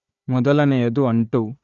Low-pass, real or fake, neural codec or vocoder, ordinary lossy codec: 7.2 kHz; fake; codec, 16 kHz, 4 kbps, FunCodec, trained on Chinese and English, 50 frames a second; Opus, 64 kbps